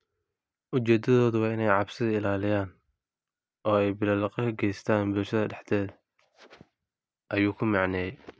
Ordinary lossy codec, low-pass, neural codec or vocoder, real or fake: none; none; none; real